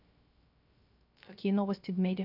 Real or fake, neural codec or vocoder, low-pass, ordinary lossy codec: fake; codec, 16 kHz, 0.3 kbps, FocalCodec; 5.4 kHz; none